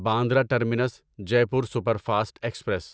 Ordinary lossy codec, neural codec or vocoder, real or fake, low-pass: none; none; real; none